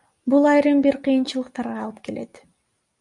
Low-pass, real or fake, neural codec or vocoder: 10.8 kHz; real; none